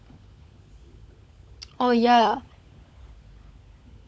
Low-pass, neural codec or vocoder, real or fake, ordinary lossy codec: none; codec, 16 kHz, 16 kbps, FunCodec, trained on LibriTTS, 50 frames a second; fake; none